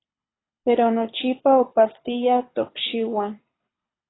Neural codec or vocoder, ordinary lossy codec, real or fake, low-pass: codec, 24 kHz, 6 kbps, HILCodec; AAC, 16 kbps; fake; 7.2 kHz